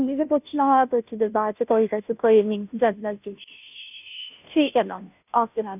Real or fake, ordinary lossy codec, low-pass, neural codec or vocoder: fake; none; 3.6 kHz; codec, 16 kHz, 0.5 kbps, FunCodec, trained on Chinese and English, 25 frames a second